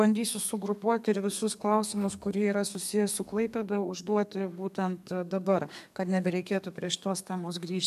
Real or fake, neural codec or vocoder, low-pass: fake; codec, 32 kHz, 1.9 kbps, SNAC; 14.4 kHz